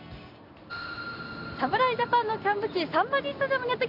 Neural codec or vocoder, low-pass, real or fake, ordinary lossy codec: none; 5.4 kHz; real; none